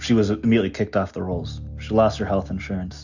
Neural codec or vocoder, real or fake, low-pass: none; real; 7.2 kHz